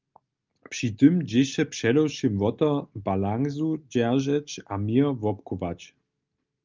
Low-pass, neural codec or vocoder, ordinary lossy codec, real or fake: 7.2 kHz; none; Opus, 24 kbps; real